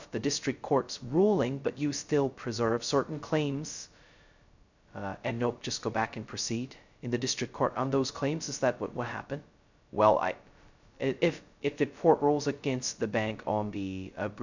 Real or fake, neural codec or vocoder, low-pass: fake; codec, 16 kHz, 0.2 kbps, FocalCodec; 7.2 kHz